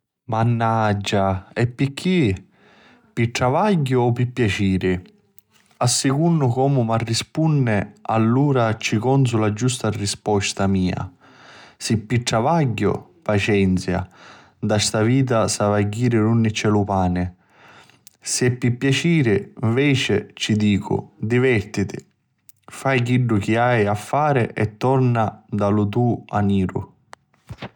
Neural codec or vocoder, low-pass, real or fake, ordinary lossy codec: none; 19.8 kHz; real; none